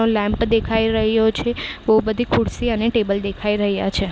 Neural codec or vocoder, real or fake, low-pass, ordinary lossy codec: none; real; none; none